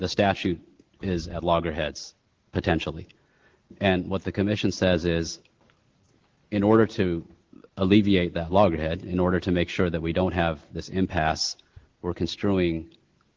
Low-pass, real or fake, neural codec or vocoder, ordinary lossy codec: 7.2 kHz; real; none; Opus, 16 kbps